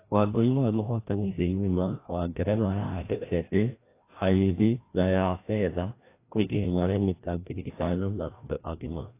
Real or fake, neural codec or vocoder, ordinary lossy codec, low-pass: fake; codec, 16 kHz, 1 kbps, FreqCodec, larger model; AAC, 24 kbps; 3.6 kHz